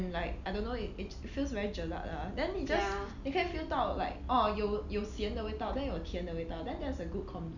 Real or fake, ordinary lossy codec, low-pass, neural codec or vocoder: real; none; 7.2 kHz; none